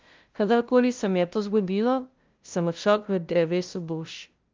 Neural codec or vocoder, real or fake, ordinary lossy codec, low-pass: codec, 16 kHz, 0.5 kbps, FunCodec, trained on LibriTTS, 25 frames a second; fake; Opus, 32 kbps; 7.2 kHz